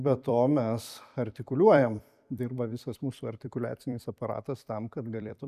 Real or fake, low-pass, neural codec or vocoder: fake; 14.4 kHz; vocoder, 44.1 kHz, 128 mel bands every 256 samples, BigVGAN v2